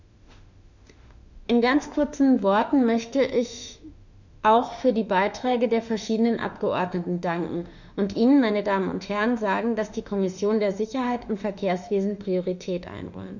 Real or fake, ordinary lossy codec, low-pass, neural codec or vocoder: fake; none; 7.2 kHz; autoencoder, 48 kHz, 32 numbers a frame, DAC-VAE, trained on Japanese speech